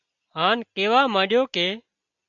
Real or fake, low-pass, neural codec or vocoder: real; 7.2 kHz; none